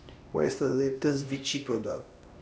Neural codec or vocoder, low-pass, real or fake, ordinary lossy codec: codec, 16 kHz, 1 kbps, X-Codec, HuBERT features, trained on LibriSpeech; none; fake; none